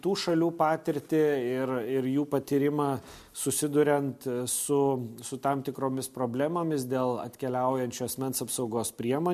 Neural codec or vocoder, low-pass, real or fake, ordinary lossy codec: none; 14.4 kHz; real; MP3, 64 kbps